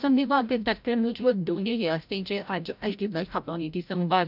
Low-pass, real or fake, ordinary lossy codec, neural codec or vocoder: 5.4 kHz; fake; none; codec, 16 kHz, 0.5 kbps, FreqCodec, larger model